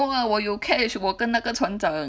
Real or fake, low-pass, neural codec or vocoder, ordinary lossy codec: real; none; none; none